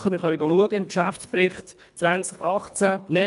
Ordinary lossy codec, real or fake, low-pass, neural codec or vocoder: none; fake; 10.8 kHz; codec, 24 kHz, 1.5 kbps, HILCodec